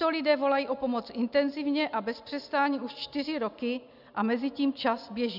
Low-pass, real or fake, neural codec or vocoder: 5.4 kHz; real; none